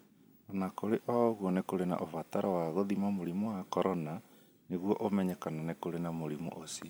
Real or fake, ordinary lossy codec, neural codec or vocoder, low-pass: real; none; none; none